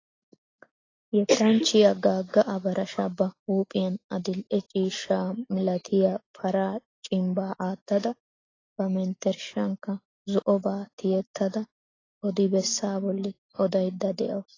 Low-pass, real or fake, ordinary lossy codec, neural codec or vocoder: 7.2 kHz; real; AAC, 32 kbps; none